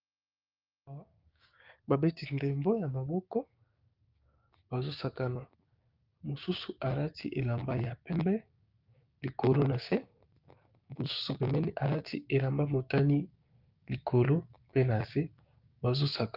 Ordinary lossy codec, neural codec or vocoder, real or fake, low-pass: Opus, 24 kbps; codec, 16 kHz, 6 kbps, DAC; fake; 5.4 kHz